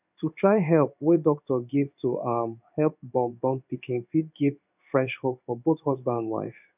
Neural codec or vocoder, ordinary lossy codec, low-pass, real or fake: codec, 16 kHz in and 24 kHz out, 1 kbps, XY-Tokenizer; none; 3.6 kHz; fake